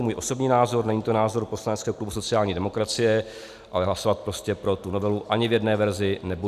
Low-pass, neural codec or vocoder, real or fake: 14.4 kHz; none; real